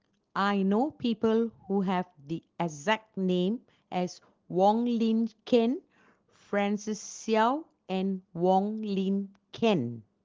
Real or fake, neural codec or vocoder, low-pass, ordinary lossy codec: real; none; 7.2 kHz; Opus, 16 kbps